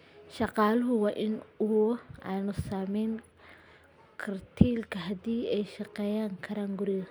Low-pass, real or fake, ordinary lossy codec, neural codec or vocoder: none; real; none; none